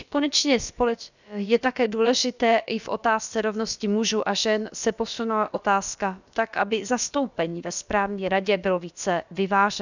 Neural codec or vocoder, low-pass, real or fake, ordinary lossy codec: codec, 16 kHz, about 1 kbps, DyCAST, with the encoder's durations; 7.2 kHz; fake; none